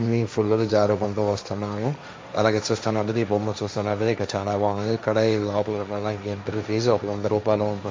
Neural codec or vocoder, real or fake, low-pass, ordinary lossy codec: codec, 16 kHz, 1.1 kbps, Voila-Tokenizer; fake; none; none